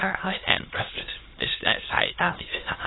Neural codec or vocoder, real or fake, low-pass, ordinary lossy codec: autoencoder, 22.05 kHz, a latent of 192 numbers a frame, VITS, trained on many speakers; fake; 7.2 kHz; AAC, 16 kbps